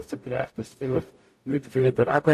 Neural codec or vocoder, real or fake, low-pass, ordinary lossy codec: codec, 44.1 kHz, 0.9 kbps, DAC; fake; 14.4 kHz; AAC, 64 kbps